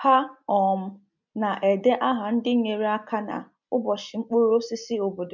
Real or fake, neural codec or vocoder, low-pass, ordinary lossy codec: real; none; 7.2 kHz; MP3, 64 kbps